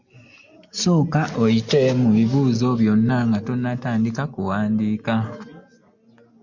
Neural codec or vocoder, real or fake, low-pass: none; real; 7.2 kHz